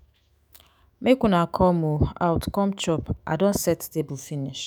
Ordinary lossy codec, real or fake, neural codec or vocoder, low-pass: none; fake; autoencoder, 48 kHz, 128 numbers a frame, DAC-VAE, trained on Japanese speech; none